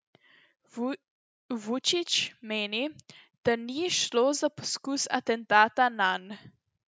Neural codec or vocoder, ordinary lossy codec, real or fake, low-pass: none; none; real; none